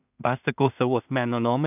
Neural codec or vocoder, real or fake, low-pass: codec, 16 kHz in and 24 kHz out, 0.4 kbps, LongCat-Audio-Codec, two codebook decoder; fake; 3.6 kHz